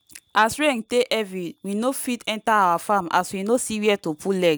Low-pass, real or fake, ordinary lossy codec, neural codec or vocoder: none; real; none; none